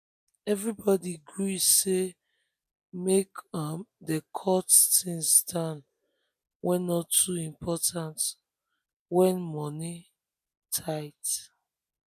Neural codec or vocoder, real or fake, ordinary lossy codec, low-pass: none; real; none; 14.4 kHz